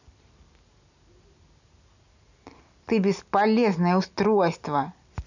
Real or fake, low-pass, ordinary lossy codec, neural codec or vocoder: real; 7.2 kHz; none; none